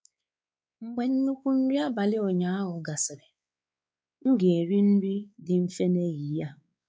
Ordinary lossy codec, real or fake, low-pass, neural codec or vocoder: none; fake; none; codec, 16 kHz, 4 kbps, X-Codec, WavLM features, trained on Multilingual LibriSpeech